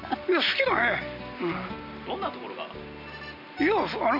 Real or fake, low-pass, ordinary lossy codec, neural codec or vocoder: real; 5.4 kHz; none; none